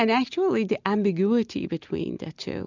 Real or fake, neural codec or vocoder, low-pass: real; none; 7.2 kHz